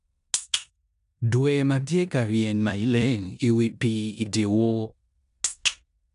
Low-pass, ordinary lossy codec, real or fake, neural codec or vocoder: 10.8 kHz; none; fake; codec, 16 kHz in and 24 kHz out, 0.9 kbps, LongCat-Audio-Codec, four codebook decoder